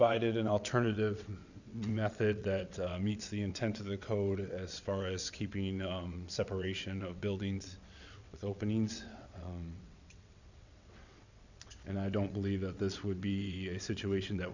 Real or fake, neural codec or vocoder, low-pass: fake; vocoder, 22.05 kHz, 80 mel bands, WaveNeXt; 7.2 kHz